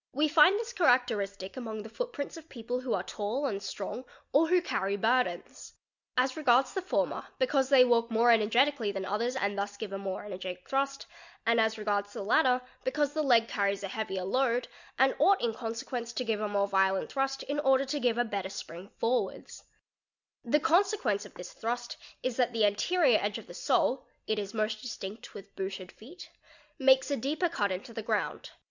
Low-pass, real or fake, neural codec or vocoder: 7.2 kHz; real; none